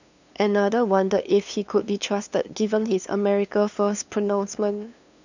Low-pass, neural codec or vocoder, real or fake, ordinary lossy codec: 7.2 kHz; codec, 16 kHz, 2 kbps, FunCodec, trained on LibriTTS, 25 frames a second; fake; none